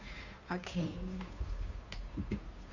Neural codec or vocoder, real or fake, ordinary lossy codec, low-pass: codec, 16 kHz, 2 kbps, FunCodec, trained on Chinese and English, 25 frames a second; fake; none; 7.2 kHz